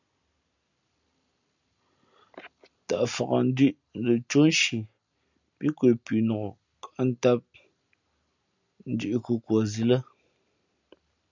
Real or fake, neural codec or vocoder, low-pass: real; none; 7.2 kHz